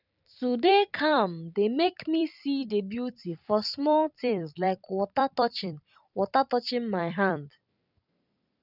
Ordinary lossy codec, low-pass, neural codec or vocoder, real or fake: none; 5.4 kHz; vocoder, 44.1 kHz, 128 mel bands every 256 samples, BigVGAN v2; fake